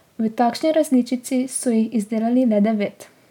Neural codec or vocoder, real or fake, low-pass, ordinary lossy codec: none; real; 19.8 kHz; none